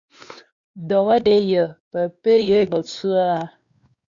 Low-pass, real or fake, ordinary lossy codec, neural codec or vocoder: 7.2 kHz; fake; Opus, 64 kbps; codec, 16 kHz, 2 kbps, X-Codec, WavLM features, trained on Multilingual LibriSpeech